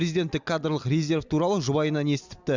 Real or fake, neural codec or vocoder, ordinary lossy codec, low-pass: real; none; Opus, 64 kbps; 7.2 kHz